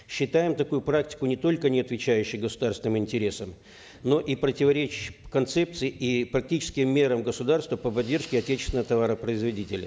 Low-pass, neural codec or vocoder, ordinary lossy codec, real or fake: none; none; none; real